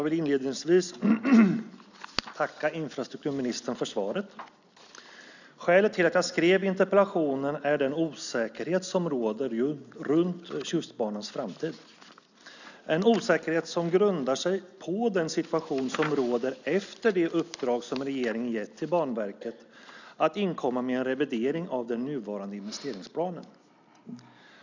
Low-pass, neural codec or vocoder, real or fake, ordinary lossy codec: 7.2 kHz; none; real; none